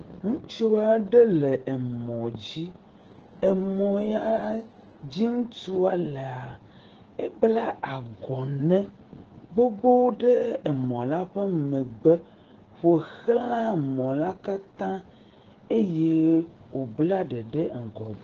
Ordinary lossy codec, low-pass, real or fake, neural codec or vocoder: Opus, 16 kbps; 7.2 kHz; fake; codec, 16 kHz, 8 kbps, FreqCodec, smaller model